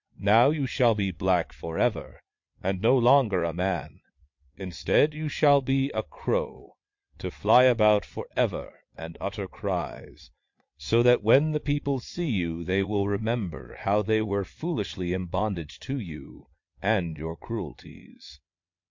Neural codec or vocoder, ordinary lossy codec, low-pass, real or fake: vocoder, 22.05 kHz, 80 mel bands, Vocos; MP3, 48 kbps; 7.2 kHz; fake